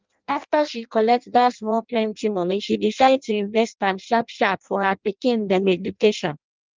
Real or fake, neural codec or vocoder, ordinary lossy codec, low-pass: fake; codec, 16 kHz in and 24 kHz out, 0.6 kbps, FireRedTTS-2 codec; Opus, 24 kbps; 7.2 kHz